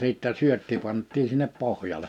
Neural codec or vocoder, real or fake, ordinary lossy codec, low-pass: none; real; none; 19.8 kHz